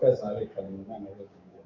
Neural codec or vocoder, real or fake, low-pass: codec, 16 kHz, 6 kbps, DAC; fake; 7.2 kHz